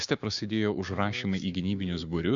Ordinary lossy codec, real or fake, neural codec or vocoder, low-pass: Opus, 64 kbps; fake; codec, 16 kHz, 6 kbps, DAC; 7.2 kHz